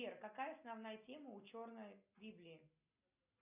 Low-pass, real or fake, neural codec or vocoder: 3.6 kHz; real; none